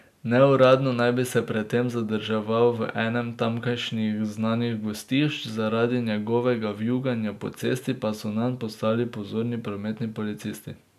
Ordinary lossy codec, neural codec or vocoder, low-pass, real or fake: none; none; 14.4 kHz; real